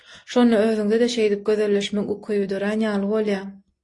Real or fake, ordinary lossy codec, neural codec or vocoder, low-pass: real; AAC, 48 kbps; none; 10.8 kHz